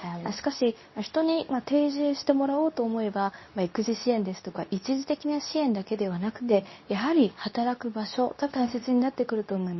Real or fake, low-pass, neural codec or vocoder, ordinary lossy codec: fake; 7.2 kHz; codec, 24 kHz, 0.9 kbps, WavTokenizer, medium speech release version 2; MP3, 24 kbps